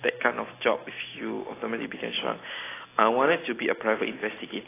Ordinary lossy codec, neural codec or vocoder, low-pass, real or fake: AAC, 16 kbps; none; 3.6 kHz; real